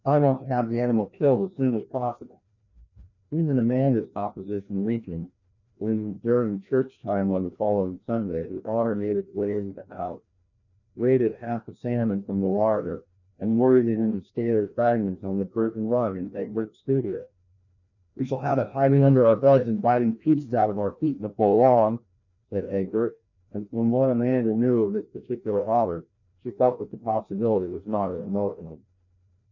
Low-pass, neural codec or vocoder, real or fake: 7.2 kHz; codec, 16 kHz, 1 kbps, FreqCodec, larger model; fake